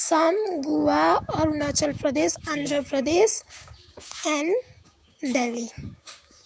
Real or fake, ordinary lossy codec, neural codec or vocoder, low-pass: fake; none; codec, 16 kHz, 6 kbps, DAC; none